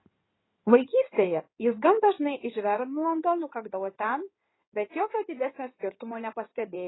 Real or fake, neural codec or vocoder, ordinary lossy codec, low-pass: fake; codec, 16 kHz in and 24 kHz out, 2.2 kbps, FireRedTTS-2 codec; AAC, 16 kbps; 7.2 kHz